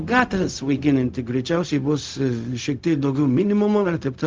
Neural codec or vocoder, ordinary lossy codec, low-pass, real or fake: codec, 16 kHz, 0.4 kbps, LongCat-Audio-Codec; Opus, 24 kbps; 7.2 kHz; fake